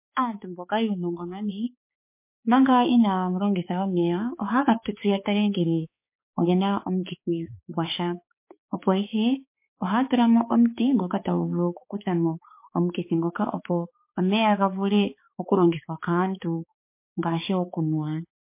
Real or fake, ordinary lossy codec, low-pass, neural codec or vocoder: fake; MP3, 24 kbps; 3.6 kHz; codec, 16 kHz, 4 kbps, X-Codec, HuBERT features, trained on balanced general audio